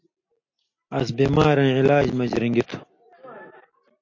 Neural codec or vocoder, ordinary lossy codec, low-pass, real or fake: none; MP3, 48 kbps; 7.2 kHz; real